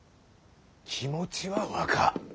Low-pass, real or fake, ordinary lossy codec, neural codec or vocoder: none; real; none; none